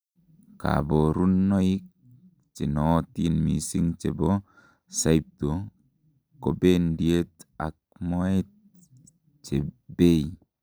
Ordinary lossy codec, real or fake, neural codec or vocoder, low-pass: none; real; none; none